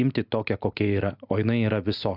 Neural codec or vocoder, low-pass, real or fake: none; 5.4 kHz; real